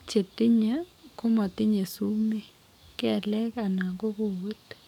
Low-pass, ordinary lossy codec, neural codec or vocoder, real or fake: 19.8 kHz; none; codec, 44.1 kHz, 7.8 kbps, DAC; fake